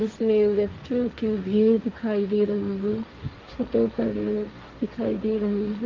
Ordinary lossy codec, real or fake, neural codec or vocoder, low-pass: Opus, 24 kbps; fake; codec, 16 kHz, 1.1 kbps, Voila-Tokenizer; 7.2 kHz